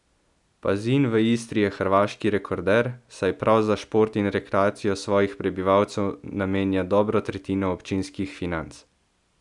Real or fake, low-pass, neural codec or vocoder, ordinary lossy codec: real; 10.8 kHz; none; none